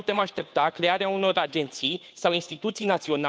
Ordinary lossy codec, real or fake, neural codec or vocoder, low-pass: none; fake; codec, 16 kHz, 2 kbps, FunCodec, trained on Chinese and English, 25 frames a second; none